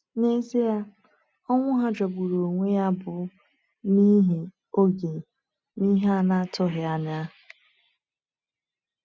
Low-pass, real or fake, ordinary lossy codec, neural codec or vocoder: none; real; none; none